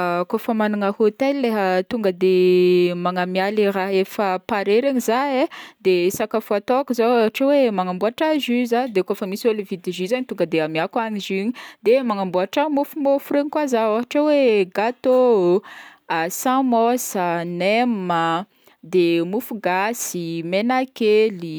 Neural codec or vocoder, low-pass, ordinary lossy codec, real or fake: none; none; none; real